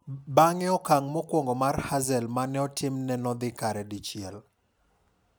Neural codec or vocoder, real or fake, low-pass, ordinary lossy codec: none; real; none; none